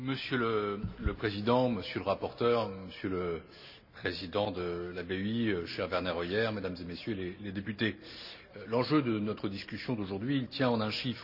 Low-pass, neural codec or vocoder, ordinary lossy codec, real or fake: 5.4 kHz; none; none; real